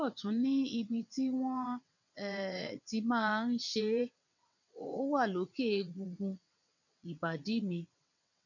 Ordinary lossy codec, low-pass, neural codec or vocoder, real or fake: none; 7.2 kHz; vocoder, 22.05 kHz, 80 mel bands, WaveNeXt; fake